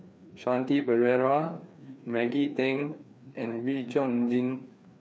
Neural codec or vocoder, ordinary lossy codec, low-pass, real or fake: codec, 16 kHz, 2 kbps, FreqCodec, larger model; none; none; fake